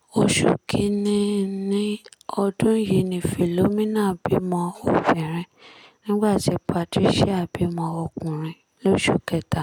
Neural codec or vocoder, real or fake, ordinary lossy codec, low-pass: none; real; none; 19.8 kHz